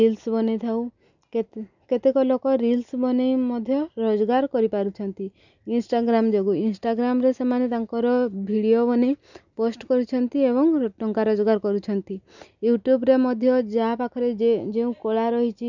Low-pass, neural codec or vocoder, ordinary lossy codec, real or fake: 7.2 kHz; none; none; real